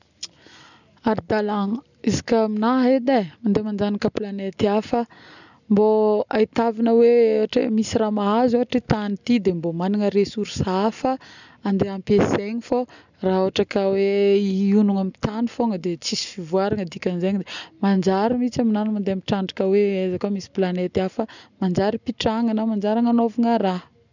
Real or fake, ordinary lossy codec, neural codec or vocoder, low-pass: real; none; none; 7.2 kHz